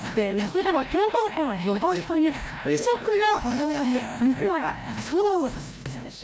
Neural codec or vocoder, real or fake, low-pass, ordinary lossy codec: codec, 16 kHz, 0.5 kbps, FreqCodec, larger model; fake; none; none